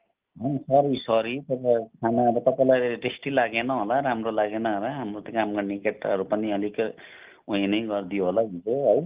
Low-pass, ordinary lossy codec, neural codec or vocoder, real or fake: 3.6 kHz; Opus, 32 kbps; none; real